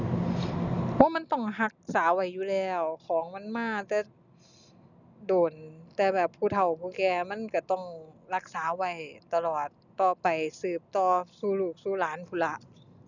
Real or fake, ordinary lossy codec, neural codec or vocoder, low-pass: real; none; none; 7.2 kHz